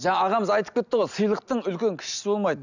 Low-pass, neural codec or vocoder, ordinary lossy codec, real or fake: 7.2 kHz; none; none; real